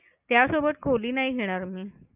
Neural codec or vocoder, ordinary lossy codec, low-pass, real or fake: none; Opus, 64 kbps; 3.6 kHz; real